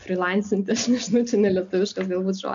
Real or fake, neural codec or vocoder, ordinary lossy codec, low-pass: real; none; AAC, 64 kbps; 7.2 kHz